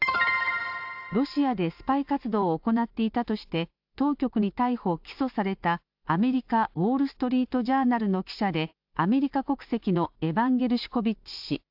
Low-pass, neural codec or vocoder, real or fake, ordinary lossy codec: 5.4 kHz; none; real; AAC, 48 kbps